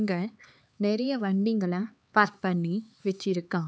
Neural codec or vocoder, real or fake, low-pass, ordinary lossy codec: codec, 16 kHz, 4 kbps, X-Codec, HuBERT features, trained on LibriSpeech; fake; none; none